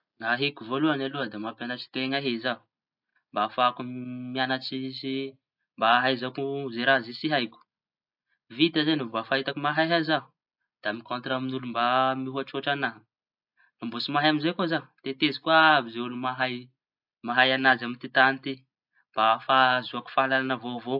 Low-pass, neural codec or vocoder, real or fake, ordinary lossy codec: 5.4 kHz; none; real; AAC, 48 kbps